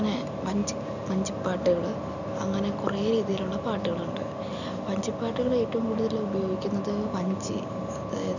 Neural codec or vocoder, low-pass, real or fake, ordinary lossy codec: none; 7.2 kHz; real; none